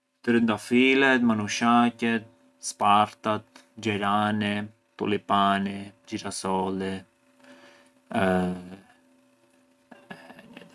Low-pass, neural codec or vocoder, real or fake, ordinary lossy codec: none; none; real; none